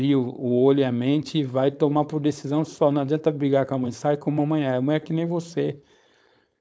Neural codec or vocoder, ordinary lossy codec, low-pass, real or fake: codec, 16 kHz, 4.8 kbps, FACodec; none; none; fake